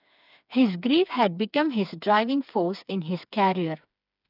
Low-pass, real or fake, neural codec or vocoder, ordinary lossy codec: 5.4 kHz; fake; codec, 16 kHz, 4 kbps, FreqCodec, smaller model; none